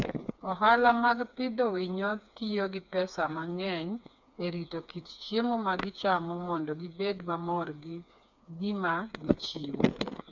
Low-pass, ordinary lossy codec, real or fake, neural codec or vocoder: 7.2 kHz; none; fake; codec, 16 kHz, 4 kbps, FreqCodec, smaller model